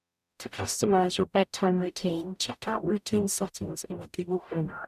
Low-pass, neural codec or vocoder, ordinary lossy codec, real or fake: 14.4 kHz; codec, 44.1 kHz, 0.9 kbps, DAC; none; fake